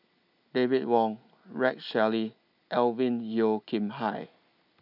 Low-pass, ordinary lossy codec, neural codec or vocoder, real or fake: 5.4 kHz; none; none; real